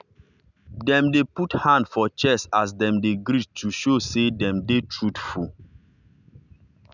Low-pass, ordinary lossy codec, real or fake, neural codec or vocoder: 7.2 kHz; none; real; none